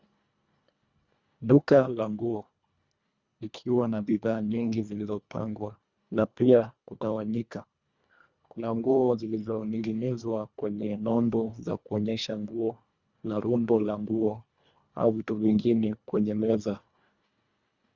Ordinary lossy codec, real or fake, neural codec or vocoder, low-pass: Opus, 64 kbps; fake; codec, 24 kHz, 1.5 kbps, HILCodec; 7.2 kHz